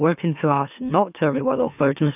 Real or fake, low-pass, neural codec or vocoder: fake; 3.6 kHz; autoencoder, 44.1 kHz, a latent of 192 numbers a frame, MeloTTS